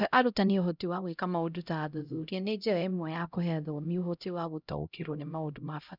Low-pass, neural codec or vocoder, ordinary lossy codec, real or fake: 5.4 kHz; codec, 16 kHz, 0.5 kbps, X-Codec, HuBERT features, trained on LibriSpeech; none; fake